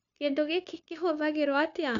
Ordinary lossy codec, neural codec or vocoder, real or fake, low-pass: none; codec, 16 kHz, 0.9 kbps, LongCat-Audio-Codec; fake; 7.2 kHz